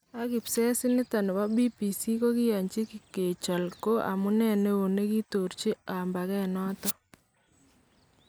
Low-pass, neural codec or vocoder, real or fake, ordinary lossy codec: none; none; real; none